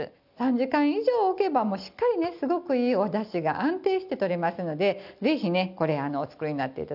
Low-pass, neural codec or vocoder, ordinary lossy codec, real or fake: 5.4 kHz; none; none; real